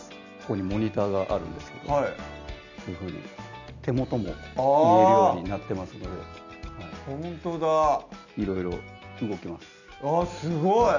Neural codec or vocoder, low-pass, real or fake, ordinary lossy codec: none; 7.2 kHz; real; none